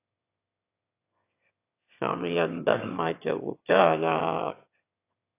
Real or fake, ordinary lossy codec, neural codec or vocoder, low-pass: fake; AAC, 24 kbps; autoencoder, 22.05 kHz, a latent of 192 numbers a frame, VITS, trained on one speaker; 3.6 kHz